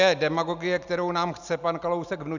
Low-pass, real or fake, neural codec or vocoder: 7.2 kHz; real; none